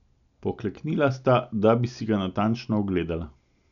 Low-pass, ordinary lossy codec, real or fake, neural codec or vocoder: 7.2 kHz; none; real; none